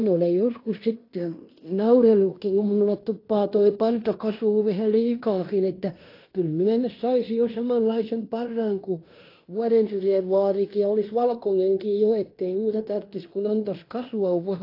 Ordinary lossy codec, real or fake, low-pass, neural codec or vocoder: AAC, 32 kbps; fake; 5.4 kHz; codec, 16 kHz in and 24 kHz out, 0.9 kbps, LongCat-Audio-Codec, fine tuned four codebook decoder